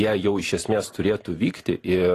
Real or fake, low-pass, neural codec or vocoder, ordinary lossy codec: fake; 14.4 kHz; vocoder, 44.1 kHz, 128 mel bands every 512 samples, BigVGAN v2; AAC, 48 kbps